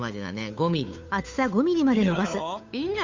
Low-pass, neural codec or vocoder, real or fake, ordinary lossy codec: 7.2 kHz; codec, 16 kHz, 8 kbps, FunCodec, trained on Chinese and English, 25 frames a second; fake; MP3, 64 kbps